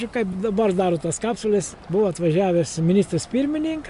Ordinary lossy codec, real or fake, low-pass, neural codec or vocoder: MP3, 64 kbps; real; 10.8 kHz; none